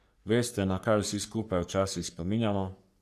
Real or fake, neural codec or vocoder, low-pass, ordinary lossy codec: fake; codec, 44.1 kHz, 3.4 kbps, Pupu-Codec; 14.4 kHz; none